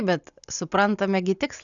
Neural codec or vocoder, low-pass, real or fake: none; 7.2 kHz; real